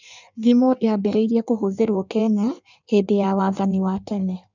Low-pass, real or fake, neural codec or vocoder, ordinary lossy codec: 7.2 kHz; fake; codec, 16 kHz in and 24 kHz out, 1.1 kbps, FireRedTTS-2 codec; none